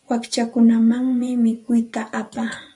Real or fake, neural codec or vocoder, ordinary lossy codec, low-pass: fake; vocoder, 24 kHz, 100 mel bands, Vocos; MP3, 64 kbps; 10.8 kHz